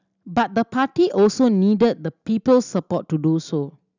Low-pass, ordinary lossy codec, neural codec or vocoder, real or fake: 7.2 kHz; none; none; real